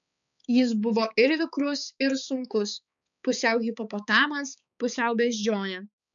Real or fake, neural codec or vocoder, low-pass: fake; codec, 16 kHz, 4 kbps, X-Codec, HuBERT features, trained on balanced general audio; 7.2 kHz